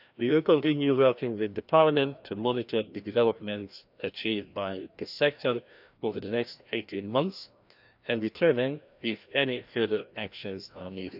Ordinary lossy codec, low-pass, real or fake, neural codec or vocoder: none; 5.4 kHz; fake; codec, 16 kHz, 1 kbps, FreqCodec, larger model